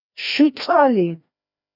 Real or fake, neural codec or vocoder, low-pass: fake; codec, 16 kHz, 1 kbps, FreqCodec, larger model; 5.4 kHz